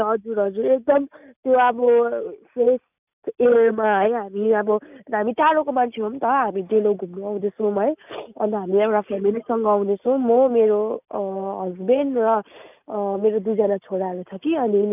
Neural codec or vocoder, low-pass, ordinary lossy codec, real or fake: none; 3.6 kHz; none; real